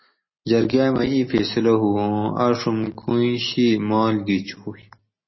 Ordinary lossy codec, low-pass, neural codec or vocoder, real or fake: MP3, 24 kbps; 7.2 kHz; none; real